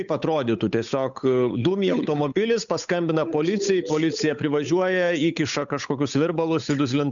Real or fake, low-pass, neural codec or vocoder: fake; 7.2 kHz; codec, 16 kHz, 8 kbps, FunCodec, trained on Chinese and English, 25 frames a second